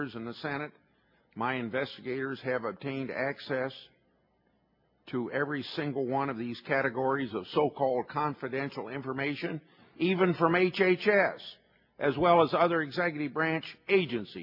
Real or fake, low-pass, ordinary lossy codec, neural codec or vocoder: real; 5.4 kHz; MP3, 32 kbps; none